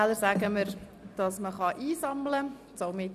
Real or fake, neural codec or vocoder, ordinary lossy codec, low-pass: real; none; none; 14.4 kHz